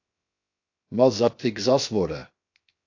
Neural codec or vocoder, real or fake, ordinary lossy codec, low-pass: codec, 16 kHz, 0.7 kbps, FocalCodec; fake; AAC, 48 kbps; 7.2 kHz